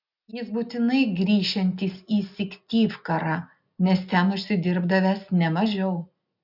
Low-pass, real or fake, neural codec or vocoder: 5.4 kHz; real; none